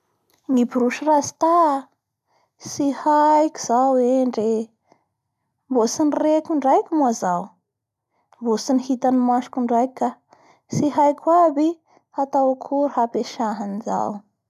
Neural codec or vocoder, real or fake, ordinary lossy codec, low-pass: none; real; none; 14.4 kHz